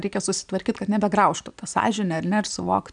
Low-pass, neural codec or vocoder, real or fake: 9.9 kHz; none; real